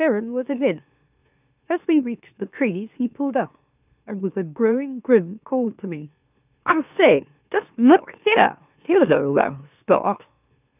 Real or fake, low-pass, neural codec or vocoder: fake; 3.6 kHz; autoencoder, 44.1 kHz, a latent of 192 numbers a frame, MeloTTS